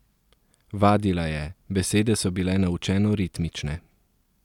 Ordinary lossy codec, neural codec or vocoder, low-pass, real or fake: none; none; 19.8 kHz; real